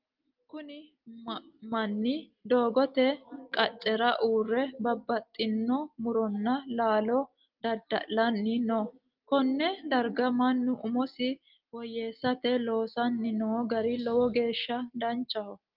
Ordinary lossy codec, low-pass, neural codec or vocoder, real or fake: Opus, 32 kbps; 5.4 kHz; none; real